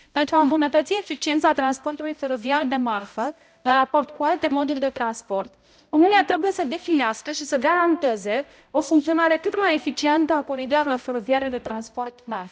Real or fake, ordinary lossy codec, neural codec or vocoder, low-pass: fake; none; codec, 16 kHz, 0.5 kbps, X-Codec, HuBERT features, trained on balanced general audio; none